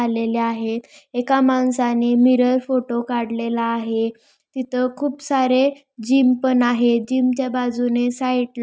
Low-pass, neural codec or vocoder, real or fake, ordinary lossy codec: none; none; real; none